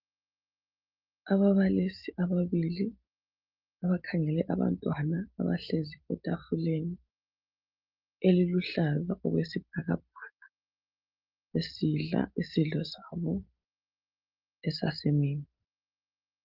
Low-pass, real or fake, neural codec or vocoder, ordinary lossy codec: 5.4 kHz; real; none; Opus, 24 kbps